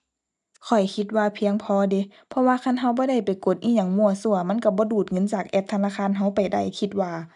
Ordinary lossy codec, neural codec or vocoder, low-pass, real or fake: none; none; 10.8 kHz; real